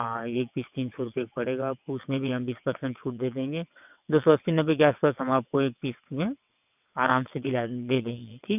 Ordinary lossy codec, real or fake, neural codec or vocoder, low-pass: none; fake; vocoder, 22.05 kHz, 80 mel bands, WaveNeXt; 3.6 kHz